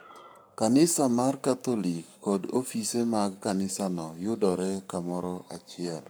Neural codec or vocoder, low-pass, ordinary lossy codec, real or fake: codec, 44.1 kHz, 7.8 kbps, Pupu-Codec; none; none; fake